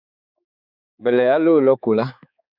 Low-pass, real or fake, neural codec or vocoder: 5.4 kHz; fake; codec, 16 kHz, 4 kbps, X-Codec, HuBERT features, trained on balanced general audio